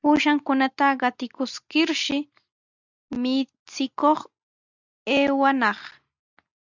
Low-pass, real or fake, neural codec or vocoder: 7.2 kHz; real; none